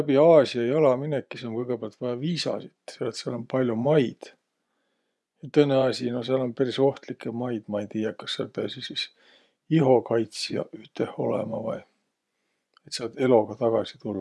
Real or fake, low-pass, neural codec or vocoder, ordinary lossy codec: fake; none; vocoder, 24 kHz, 100 mel bands, Vocos; none